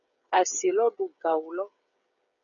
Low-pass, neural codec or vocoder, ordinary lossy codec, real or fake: 7.2 kHz; codec, 16 kHz, 8 kbps, FreqCodec, smaller model; MP3, 96 kbps; fake